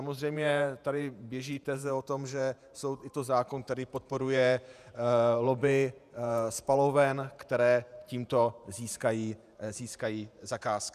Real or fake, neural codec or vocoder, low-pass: fake; vocoder, 48 kHz, 128 mel bands, Vocos; 14.4 kHz